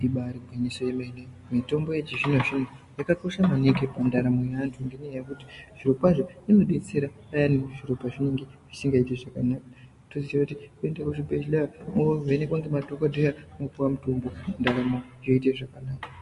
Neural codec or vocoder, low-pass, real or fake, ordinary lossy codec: none; 14.4 kHz; real; MP3, 48 kbps